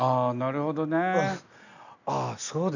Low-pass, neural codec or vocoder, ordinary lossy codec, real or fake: 7.2 kHz; none; none; real